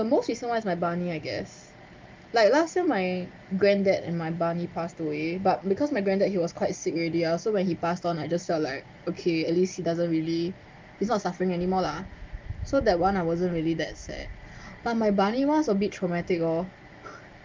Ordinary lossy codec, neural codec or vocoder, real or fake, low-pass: Opus, 24 kbps; none; real; 7.2 kHz